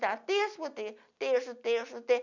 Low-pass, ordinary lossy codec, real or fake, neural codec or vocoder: 7.2 kHz; none; real; none